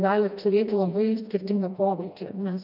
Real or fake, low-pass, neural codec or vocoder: fake; 5.4 kHz; codec, 16 kHz, 1 kbps, FreqCodec, smaller model